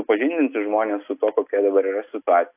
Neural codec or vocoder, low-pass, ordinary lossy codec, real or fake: none; 3.6 kHz; AAC, 32 kbps; real